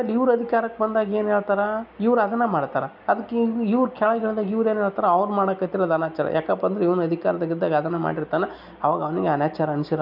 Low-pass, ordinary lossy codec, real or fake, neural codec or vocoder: 5.4 kHz; none; real; none